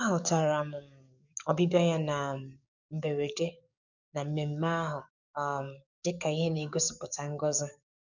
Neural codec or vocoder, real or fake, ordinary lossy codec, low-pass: codec, 44.1 kHz, 7.8 kbps, DAC; fake; none; 7.2 kHz